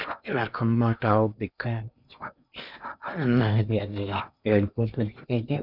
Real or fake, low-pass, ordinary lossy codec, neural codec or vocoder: fake; 5.4 kHz; none; codec, 16 kHz in and 24 kHz out, 0.8 kbps, FocalCodec, streaming, 65536 codes